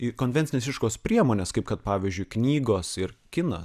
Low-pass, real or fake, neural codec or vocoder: 14.4 kHz; real; none